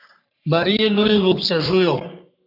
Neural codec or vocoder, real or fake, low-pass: codec, 44.1 kHz, 3.4 kbps, Pupu-Codec; fake; 5.4 kHz